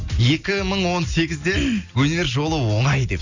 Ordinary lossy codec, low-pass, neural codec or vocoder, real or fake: Opus, 64 kbps; 7.2 kHz; none; real